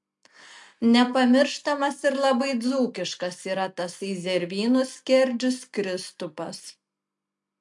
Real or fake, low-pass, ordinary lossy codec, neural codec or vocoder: real; 10.8 kHz; MP3, 64 kbps; none